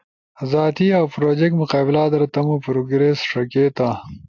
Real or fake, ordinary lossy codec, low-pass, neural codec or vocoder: real; AAC, 48 kbps; 7.2 kHz; none